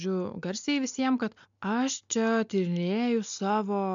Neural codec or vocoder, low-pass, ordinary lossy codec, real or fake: none; 7.2 kHz; MP3, 64 kbps; real